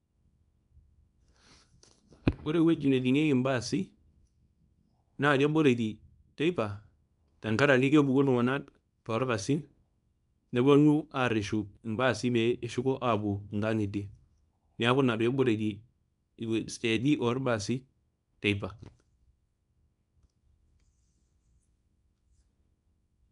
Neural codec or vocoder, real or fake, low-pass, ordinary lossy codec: codec, 24 kHz, 0.9 kbps, WavTokenizer, small release; fake; 10.8 kHz; none